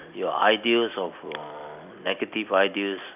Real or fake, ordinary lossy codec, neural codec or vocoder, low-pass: real; none; none; 3.6 kHz